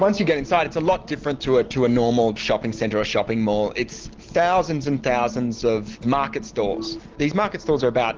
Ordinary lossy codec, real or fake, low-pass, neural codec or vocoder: Opus, 16 kbps; real; 7.2 kHz; none